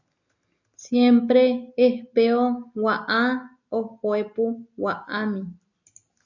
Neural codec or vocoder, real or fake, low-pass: none; real; 7.2 kHz